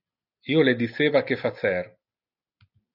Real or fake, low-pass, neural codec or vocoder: real; 5.4 kHz; none